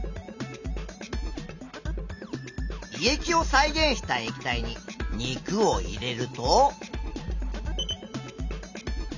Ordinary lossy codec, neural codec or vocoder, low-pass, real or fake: none; none; 7.2 kHz; real